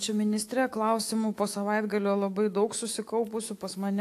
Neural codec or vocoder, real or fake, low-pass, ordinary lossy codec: autoencoder, 48 kHz, 128 numbers a frame, DAC-VAE, trained on Japanese speech; fake; 14.4 kHz; AAC, 64 kbps